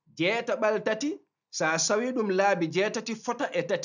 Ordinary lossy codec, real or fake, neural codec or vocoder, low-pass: none; real; none; 7.2 kHz